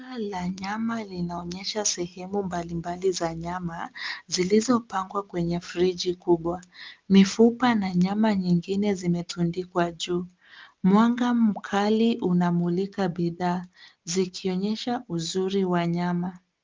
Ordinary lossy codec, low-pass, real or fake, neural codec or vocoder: Opus, 32 kbps; 7.2 kHz; real; none